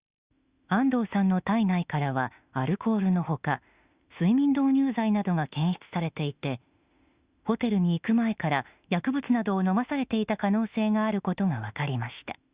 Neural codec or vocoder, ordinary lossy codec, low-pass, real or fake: autoencoder, 48 kHz, 32 numbers a frame, DAC-VAE, trained on Japanese speech; Opus, 64 kbps; 3.6 kHz; fake